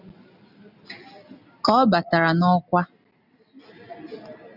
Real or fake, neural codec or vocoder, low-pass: real; none; 5.4 kHz